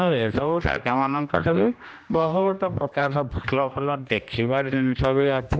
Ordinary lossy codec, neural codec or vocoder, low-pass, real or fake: none; codec, 16 kHz, 1 kbps, X-Codec, HuBERT features, trained on general audio; none; fake